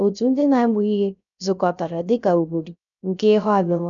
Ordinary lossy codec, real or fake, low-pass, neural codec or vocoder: none; fake; 7.2 kHz; codec, 16 kHz, 0.3 kbps, FocalCodec